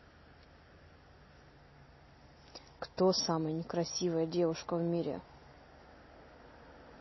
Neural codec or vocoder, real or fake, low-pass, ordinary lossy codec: none; real; 7.2 kHz; MP3, 24 kbps